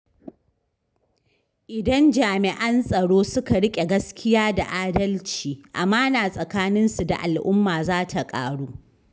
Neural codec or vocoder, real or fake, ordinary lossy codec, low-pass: none; real; none; none